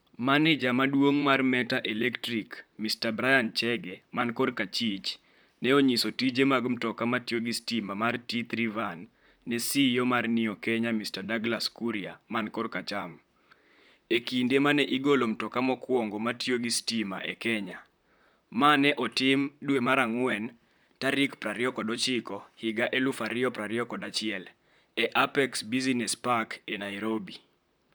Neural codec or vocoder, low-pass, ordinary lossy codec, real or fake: vocoder, 44.1 kHz, 128 mel bands, Pupu-Vocoder; none; none; fake